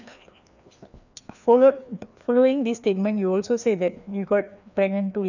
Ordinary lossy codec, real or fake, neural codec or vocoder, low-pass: none; fake; codec, 16 kHz, 2 kbps, FreqCodec, larger model; 7.2 kHz